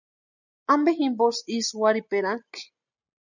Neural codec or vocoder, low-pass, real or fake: none; 7.2 kHz; real